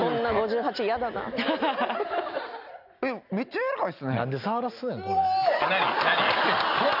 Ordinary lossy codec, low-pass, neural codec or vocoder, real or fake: none; 5.4 kHz; none; real